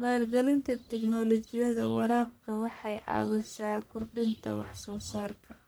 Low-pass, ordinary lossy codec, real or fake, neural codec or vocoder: none; none; fake; codec, 44.1 kHz, 1.7 kbps, Pupu-Codec